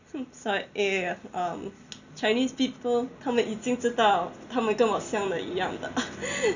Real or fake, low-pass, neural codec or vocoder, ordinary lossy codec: real; 7.2 kHz; none; none